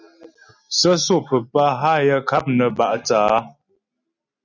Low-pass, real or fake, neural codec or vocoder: 7.2 kHz; real; none